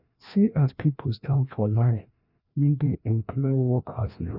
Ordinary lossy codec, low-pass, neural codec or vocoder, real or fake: none; 5.4 kHz; codec, 16 kHz, 1 kbps, FreqCodec, larger model; fake